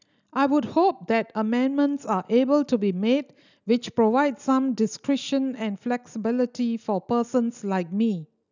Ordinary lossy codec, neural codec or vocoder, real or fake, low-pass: none; none; real; 7.2 kHz